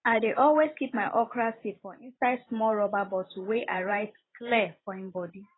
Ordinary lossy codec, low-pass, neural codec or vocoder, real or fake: AAC, 16 kbps; 7.2 kHz; vocoder, 44.1 kHz, 128 mel bands every 512 samples, BigVGAN v2; fake